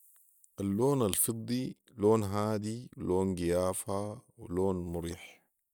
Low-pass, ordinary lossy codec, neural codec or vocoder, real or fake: none; none; none; real